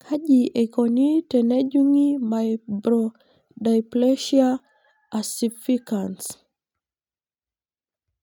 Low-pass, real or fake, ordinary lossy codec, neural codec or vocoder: 19.8 kHz; real; none; none